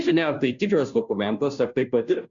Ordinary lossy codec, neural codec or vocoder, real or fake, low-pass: MP3, 64 kbps; codec, 16 kHz, 0.5 kbps, FunCodec, trained on Chinese and English, 25 frames a second; fake; 7.2 kHz